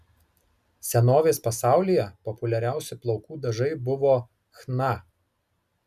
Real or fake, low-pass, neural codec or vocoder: real; 14.4 kHz; none